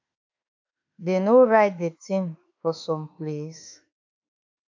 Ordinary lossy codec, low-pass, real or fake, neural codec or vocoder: AAC, 48 kbps; 7.2 kHz; fake; autoencoder, 48 kHz, 32 numbers a frame, DAC-VAE, trained on Japanese speech